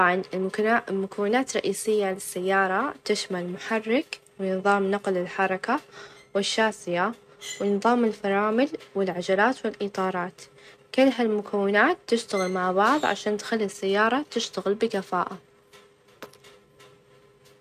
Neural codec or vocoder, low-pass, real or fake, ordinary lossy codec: none; 14.4 kHz; real; none